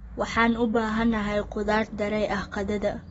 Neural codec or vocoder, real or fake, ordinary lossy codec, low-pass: none; real; AAC, 24 kbps; 19.8 kHz